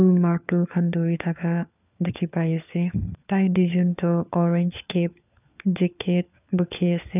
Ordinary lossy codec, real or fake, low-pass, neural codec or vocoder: none; fake; 3.6 kHz; codec, 16 kHz, 4 kbps, FunCodec, trained on LibriTTS, 50 frames a second